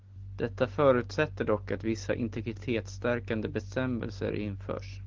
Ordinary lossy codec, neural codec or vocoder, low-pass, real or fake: Opus, 16 kbps; none; 7.2 kHz; real